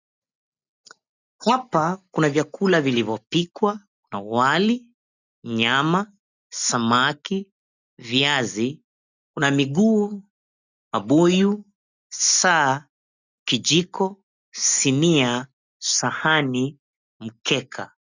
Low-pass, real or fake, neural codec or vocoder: 7.2 kHz; real; none